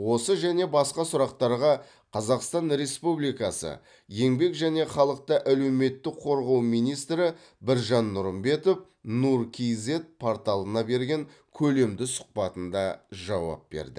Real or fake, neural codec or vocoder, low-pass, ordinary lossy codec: real; none; 9.9 kHz; none